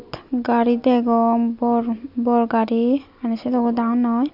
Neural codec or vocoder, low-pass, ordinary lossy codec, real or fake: none; 5.4 kHz; none; real